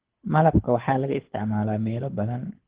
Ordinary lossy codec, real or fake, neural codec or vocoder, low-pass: Opus, 16 kbps; fake; codec, 24 kHz, 6 kbps, HILCodec; 3.6 kHz